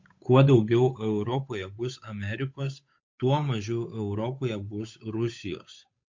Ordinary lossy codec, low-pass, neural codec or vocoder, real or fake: MP3, 48 kbps; 7.2 kHz; codec, 16 kHz, 8 kbps, FunCodec, trained on Chinese and English, 25 frames a second; fake